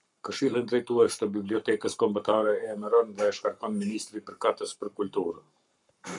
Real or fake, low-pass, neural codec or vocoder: fake; 10.8 kHz; codec, 44.1 kHz, 7.8 kbps, Pupu-Codec